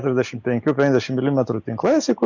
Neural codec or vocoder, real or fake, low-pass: none; real; 7.2 kHz